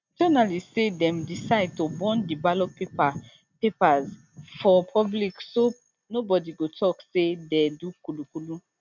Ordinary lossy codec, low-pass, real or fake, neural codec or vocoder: none; 7.2 kHz; real; none